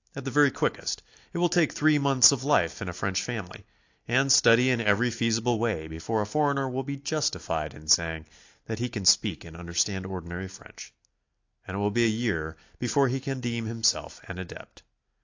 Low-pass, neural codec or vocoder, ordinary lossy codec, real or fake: 7.2 kHz; none; AAC, 48 kbps; real